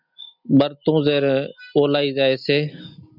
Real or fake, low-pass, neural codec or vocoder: real; 5.4 kHz; none